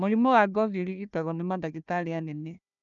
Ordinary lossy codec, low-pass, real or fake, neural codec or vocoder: none; 7.2 kHz; fake; codec, 16 kHz, 1 kbps, FunCodec, trained on Chinese and English, 50 frames a second